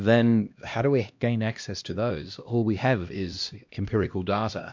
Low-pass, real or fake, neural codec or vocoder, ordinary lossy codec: 7.2 kHz; fake; codec, 16 kHz, 1 kbps, X-Codec, HuBERT features, trained on LibriSpeech; MP3, 64 kbps